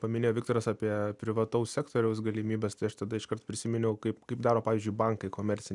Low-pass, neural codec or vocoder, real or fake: 10.8 kHz; none; real